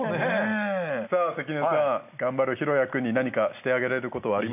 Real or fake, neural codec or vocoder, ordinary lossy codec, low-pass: real; none; none; 3.6 kHz